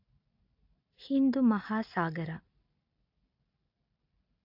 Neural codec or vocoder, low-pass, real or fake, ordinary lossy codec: none; 5.4 kHz; real; AAC, 48 kbps